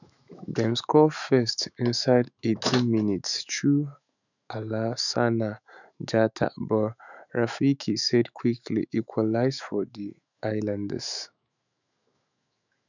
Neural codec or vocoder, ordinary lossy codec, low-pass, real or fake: autoencoder, 48 kHz, 128 numbers a frame, DAC-VAE, trained on Japanese speech; none; 7.2 kHz; fake